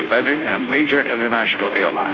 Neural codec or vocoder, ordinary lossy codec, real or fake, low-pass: codec, 16 kHz, 0.5 kbps, FunCodec, trained on Chinese and English, 25 frames a second; AAC, 32 kbps; fake; 7.2 kHz